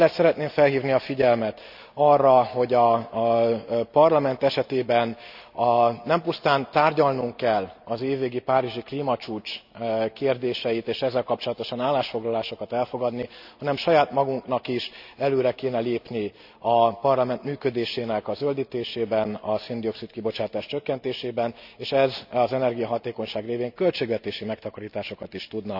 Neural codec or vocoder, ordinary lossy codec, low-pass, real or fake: none; none; 5.4 kHz; real